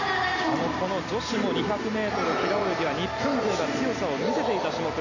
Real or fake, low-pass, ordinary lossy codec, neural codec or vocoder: real; 7.2 kHz; none; none